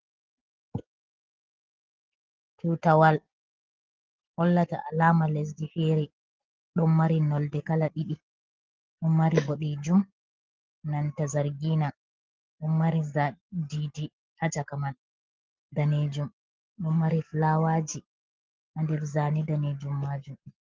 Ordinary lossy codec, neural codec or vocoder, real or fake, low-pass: Opus, 16 kbps; none; real; 7.2 kHz